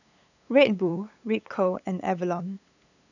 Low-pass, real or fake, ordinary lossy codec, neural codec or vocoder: 7.2 kHz; fake; none; codec, 16 kHz, 8 kbps, FunCodec, trained on LibriTTS, 25 frames a second